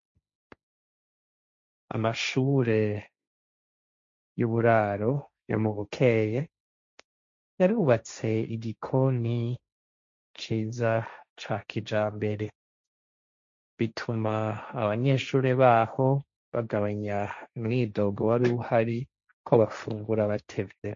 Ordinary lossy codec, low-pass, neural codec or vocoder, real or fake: MP3, 48 kbps; 7.2 kHz; codec, 16 kHz, 1.1 kbps, Voila-Tokenizer; fake